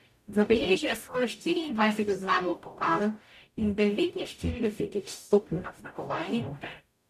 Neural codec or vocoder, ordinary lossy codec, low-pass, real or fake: codec, 44.1 kHz, 0.9 kbps, DAC; none; 14.4 kHz; fake